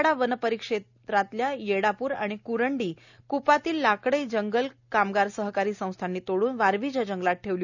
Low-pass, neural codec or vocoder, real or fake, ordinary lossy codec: 7.2 kHz; none; real; none